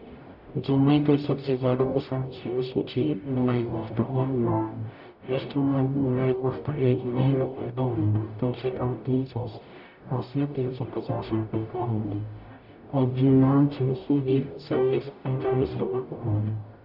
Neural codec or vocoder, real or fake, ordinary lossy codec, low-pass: codec, 44.1 kHz, 0.9 kbps, DAC; fake; none; 5.4 kHz